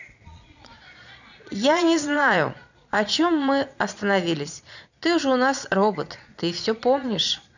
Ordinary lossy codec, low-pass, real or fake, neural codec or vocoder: none; 7.2 kHz; fake; vocoder, 22.05 kHz, 80 mel bands, Vocos